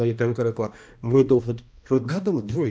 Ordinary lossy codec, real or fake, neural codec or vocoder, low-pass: none; fake; codec, 16 kHz, 1 kbps, X-Codec, HuBERT features, trained on balanced general audio; none